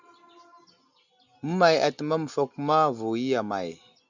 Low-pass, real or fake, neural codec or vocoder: 7.2 kHz; real; none